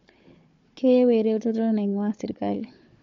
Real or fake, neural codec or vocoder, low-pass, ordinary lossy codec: fake; codec, 16 kHz, 4 kbps, FunCodec, trained on Chinese and English, 50 frames a second; 7.2 kHz; MP3, 48 kbps